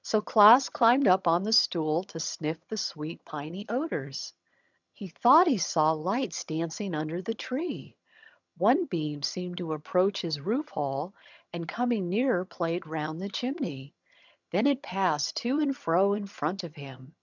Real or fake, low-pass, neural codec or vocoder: fake; 7.2 kHz; vocoder, 22.05 kHz, 80 mel bands, HiFi-GAN